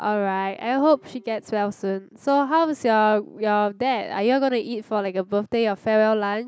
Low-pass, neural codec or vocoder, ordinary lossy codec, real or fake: none; none; none; real